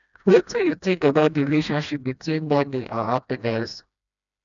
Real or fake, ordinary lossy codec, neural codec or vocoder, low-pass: fake; none; codec, 16 kHz, 1 kbps, FreqCodec, smaller model; 7.2 kHz